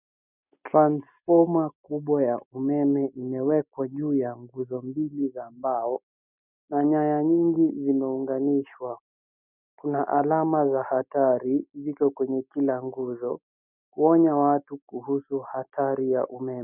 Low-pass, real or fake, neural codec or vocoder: 3.6 kHz; real; none